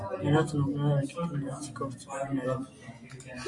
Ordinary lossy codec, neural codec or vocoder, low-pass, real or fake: AAC, 64 kbps; none; 10.8 kHz; real